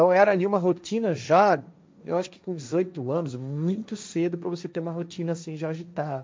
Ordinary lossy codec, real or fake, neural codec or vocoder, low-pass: none; fake; codec, 16 kHz, 1.1 kbps, Voila-Tokenizer; none